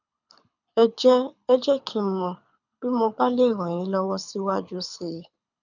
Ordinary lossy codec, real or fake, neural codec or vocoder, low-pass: none; fake; codec, 24 kHz, 6 kbps, HILCodec; 7.2 kHz